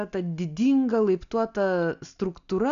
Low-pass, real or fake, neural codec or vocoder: 7.2 kHz; real; none